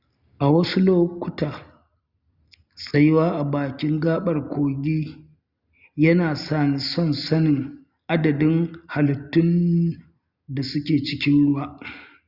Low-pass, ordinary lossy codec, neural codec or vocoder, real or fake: 5.4 kHz; Opus, 64 kbps; none; real